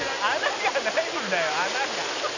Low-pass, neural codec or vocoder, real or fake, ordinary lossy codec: 7.2 kHz; none; real; none